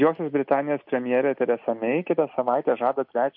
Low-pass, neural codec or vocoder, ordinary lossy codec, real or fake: 5.4 kHz; none; MP3, 48 kbps; real